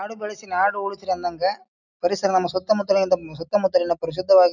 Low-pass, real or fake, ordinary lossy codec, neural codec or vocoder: 7.2 kHz; real; none; none